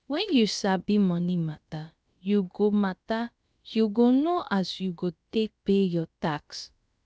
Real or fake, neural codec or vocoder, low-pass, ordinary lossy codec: fake; codec, 16 kHz, about 1 kbps, DyCAST, with the encoder's durations; none; none